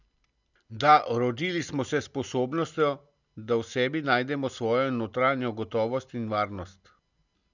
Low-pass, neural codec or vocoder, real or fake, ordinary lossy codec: 7.2 kHz; none; real; none